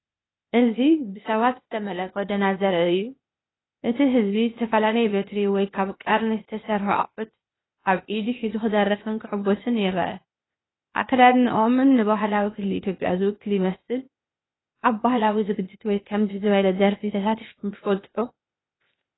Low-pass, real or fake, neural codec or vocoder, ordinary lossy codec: 7.2 kHz; fake; codec, 16 kHz, 0.8 kbps, ZipCodec; AAC, 16 kbps